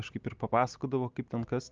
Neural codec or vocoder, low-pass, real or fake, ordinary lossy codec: none; 7.2 kHz; real; Opus, 24 kbps